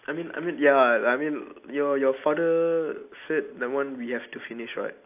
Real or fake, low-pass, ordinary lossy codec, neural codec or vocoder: real; 3.6 kHz; AAC, 32 kbps; none